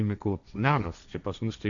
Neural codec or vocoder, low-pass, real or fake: codec, 16 kHz, 1.1 kbps, Voila-Tokenizer; 7.2 kHz; fake